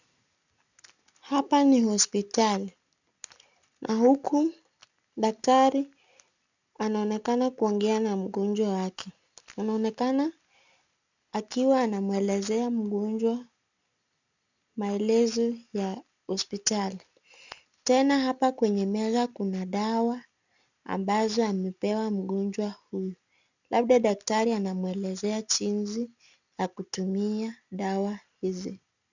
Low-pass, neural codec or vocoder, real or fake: 7.2 kHz; none; real